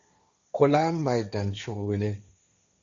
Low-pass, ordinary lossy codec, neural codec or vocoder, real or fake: 7.2 kHz; Opus, 64 kbps; codec, 16 kHz, 1.1 kbps, Voila-Tokenizer; fake